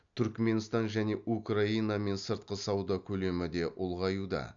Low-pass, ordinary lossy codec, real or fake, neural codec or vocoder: 7.2 kHz; none; real; none